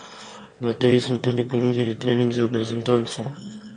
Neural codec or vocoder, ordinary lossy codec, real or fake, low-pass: autoencoder, 22.05 kHz, a latent of 192 numbers a frame, VITS, trained on one speaker; MP3, 48 kbps; fake; 9.9 kHz